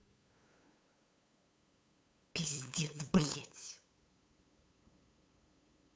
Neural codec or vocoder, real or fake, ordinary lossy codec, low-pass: codec, 16 kHz, 8 kbps, FunCodec, trained on LibriTTS, 25 frames a second; fake; none; none